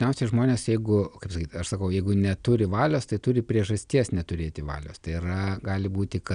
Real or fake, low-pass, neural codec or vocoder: real; 9.9 kHz; none